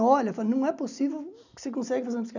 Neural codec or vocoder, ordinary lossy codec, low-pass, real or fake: none; none; 7.2 kHz; real